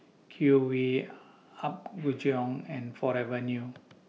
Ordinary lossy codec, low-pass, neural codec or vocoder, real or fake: none; none; none; real